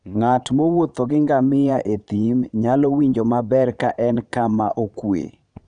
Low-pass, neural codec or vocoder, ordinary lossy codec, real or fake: 9.9 kHz; vocoder, 22.05 kHz, 80 mel bands, Vocos; none; fake